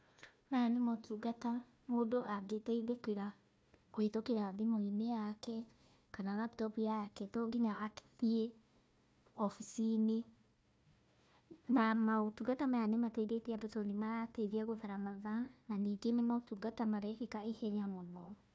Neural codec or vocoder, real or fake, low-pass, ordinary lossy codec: codec, 16 kHz, 1 kbps, FunCodec, trained on Chinese and English, 50 frames a second; fake; none; none